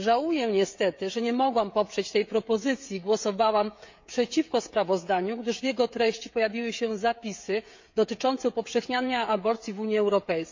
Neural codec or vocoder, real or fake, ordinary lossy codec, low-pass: codec, 16 kHz, 16 kbps, FreqCodec, smaller model; fake; MP3, 48 kbps; 7.2 kHz